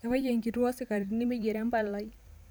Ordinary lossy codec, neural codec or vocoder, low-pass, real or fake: none; vocoder, 44.1 kHz, 128 mel bands every 512 samples, BigVGAN v2; none; fake